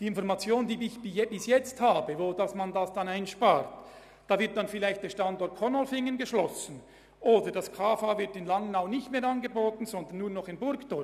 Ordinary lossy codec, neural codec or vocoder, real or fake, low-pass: none; none; real; 14.4 kHz